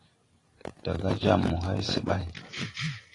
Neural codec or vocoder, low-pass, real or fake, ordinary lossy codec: vocoder, 44.1 kHz, 128 mel bands every 512 samples, BigVGAN v2; 10.8 kHz; fake; AAC, 32 kbps